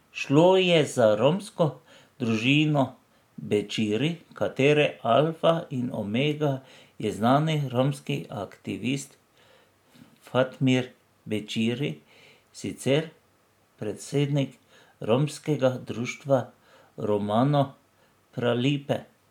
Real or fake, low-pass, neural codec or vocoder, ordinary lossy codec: real; 19.8 kHz; none; MP3, 96 kbps